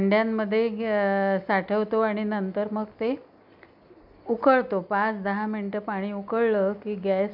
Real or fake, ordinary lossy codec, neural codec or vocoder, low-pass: real; none; none; 5.4 kHz